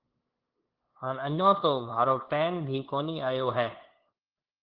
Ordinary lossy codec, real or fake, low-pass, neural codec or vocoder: Opus, 16 kbps; fake; 5.4 kHz; codec, 16 kHz, 2 kbps, FunCodec, trained on LibriTTS, 25 frames a second